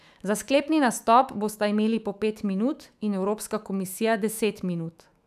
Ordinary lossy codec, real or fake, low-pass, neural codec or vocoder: none; fake; 14.4 kHz; autoencoder, 48 kHz, 128 numbers a frame, DAC-VAE, trained on Japanese speech